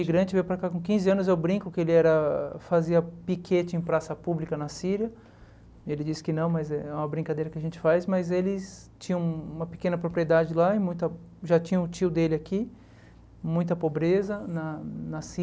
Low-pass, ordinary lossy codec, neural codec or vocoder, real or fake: none; none; none; real